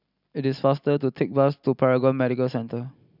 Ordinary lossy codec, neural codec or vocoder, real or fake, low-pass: none; none; real; 5.4 kHz